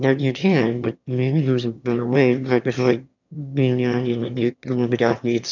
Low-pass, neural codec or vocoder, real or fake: 7.2 kHz; autoencoder, 22.05 kHz, a latent of 192 numbers a frame, VITS, trained on one speaker; fake